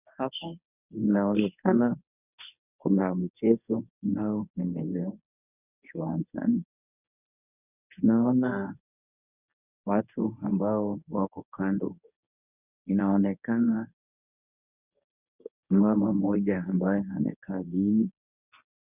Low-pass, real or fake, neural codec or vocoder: 3.6 kHz; fake; codec, 24 kHz, 0.9 kbps, WavTokenizer, medium speech release version 1